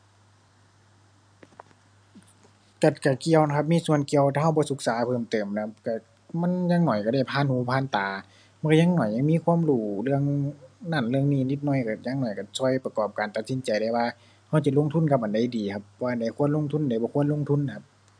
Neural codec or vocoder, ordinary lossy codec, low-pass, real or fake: none; none; 9.9 kHz; real